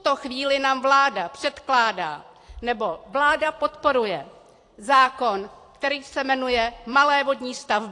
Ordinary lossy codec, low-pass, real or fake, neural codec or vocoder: AAC, 48 kbps; 10.8 kHz; fake; vocoder, 44.1 kHz, 128 mel bands every 256 samples, BigVGAN v2